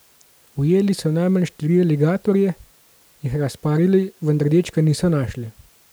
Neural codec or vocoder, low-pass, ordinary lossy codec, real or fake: none; none; none; real